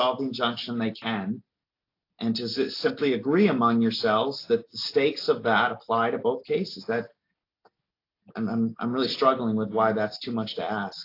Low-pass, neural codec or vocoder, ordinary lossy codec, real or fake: 5.4 kHz; none; AAC, 32 kbps; real